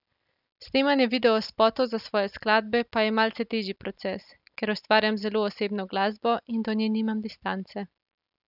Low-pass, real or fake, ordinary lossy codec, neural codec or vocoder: 5.4 kHz; real; none; none